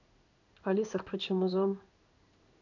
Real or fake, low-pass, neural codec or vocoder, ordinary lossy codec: fake; 7.2 kHz; codec, 16 kHz in and 24 kHz out, 1 kbps, XY-Tokenizer; none